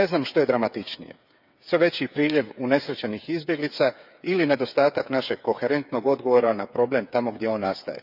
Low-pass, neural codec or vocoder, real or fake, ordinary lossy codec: 5.4 kHz; codec, 16 kHz, 8 kbps, FreqCodec, smaller model; fake; none